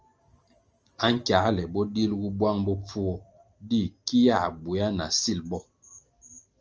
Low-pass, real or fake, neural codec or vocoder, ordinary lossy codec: 7.2 kHz; real; none; Opus, 24 kbps